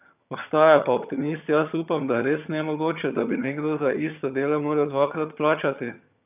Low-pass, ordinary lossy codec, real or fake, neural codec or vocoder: 3.6 kHz; none; fake; vocoder, 22.05 kHz, 80 mel bands, HiFi-GAN